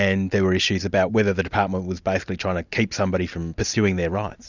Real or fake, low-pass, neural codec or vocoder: real; 7.2 kHz; none